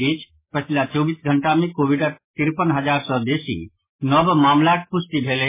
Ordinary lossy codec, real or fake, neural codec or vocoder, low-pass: MP3, 16 kbps; real; none; 3.6 kHz